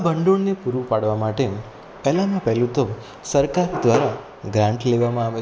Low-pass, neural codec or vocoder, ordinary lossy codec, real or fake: none; none; none; real